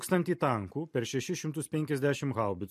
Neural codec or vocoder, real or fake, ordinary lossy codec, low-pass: vocoder, 44.1 kHz, 128 mel bands every 256 samples, BigVGAN v2; fake; MP3, 64 kbps; 14.4 kHz